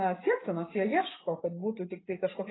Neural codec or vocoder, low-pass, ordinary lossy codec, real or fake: vocoder, 24 kHz, 100 mel bands, Vocos; 7.2 kHz; AAC, 16 kbps; fake